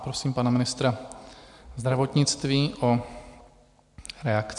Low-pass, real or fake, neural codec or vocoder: 10.8 kHz; real; none